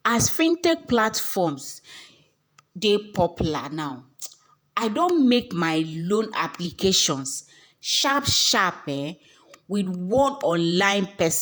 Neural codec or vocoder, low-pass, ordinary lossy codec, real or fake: vocoder, 48 kHz, 128 mel bands, Vocos; none; none; fake